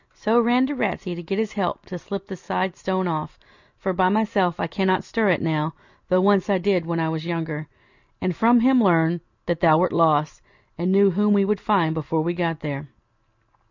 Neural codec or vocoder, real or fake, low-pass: none; real; 7.2 kHz